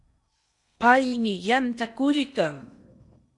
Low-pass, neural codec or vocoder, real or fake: 10.8 kHz; codec, 16 kHz in and 24 kHz out, 0.8 kbps, FocalCodec, streaming, 65536 codes; fake